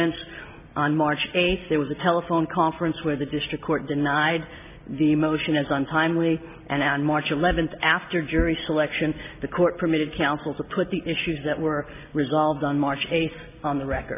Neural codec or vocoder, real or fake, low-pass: none; real; 3.6 kHz